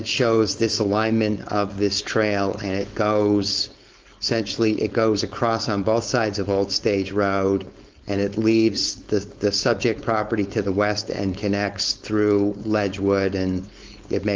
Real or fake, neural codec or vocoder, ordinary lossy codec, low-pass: fake; codec, 16 kHz, 4.8 kbps, FACodec; Opus, 24 kbps; 7.2 kHz